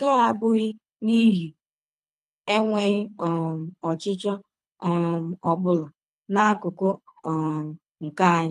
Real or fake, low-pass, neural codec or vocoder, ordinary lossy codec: fake; none; codec, 24 kHz, 3 kbps, HILCodec; none